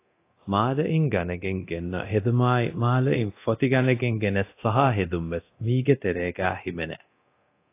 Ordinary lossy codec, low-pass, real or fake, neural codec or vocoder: AAC, 24 kbps; 3.6 kHz; fake; codec, 24 kHz, 0.9 kbps, DualCodec